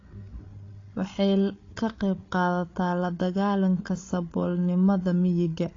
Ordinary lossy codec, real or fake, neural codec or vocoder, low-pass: AAC, 32 kbps; fake; codec, 16 kHz, 8 kbps, FreqCodec, larger model; 7.2 kHz